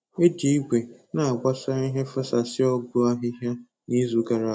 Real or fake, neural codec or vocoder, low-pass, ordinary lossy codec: real; none; none; none